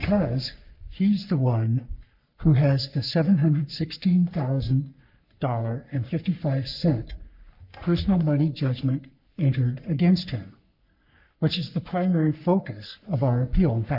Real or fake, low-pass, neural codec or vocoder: fake; 5.4 kHz; codec, 44.1 kHz, 3.4 kbps, Pupu-Codec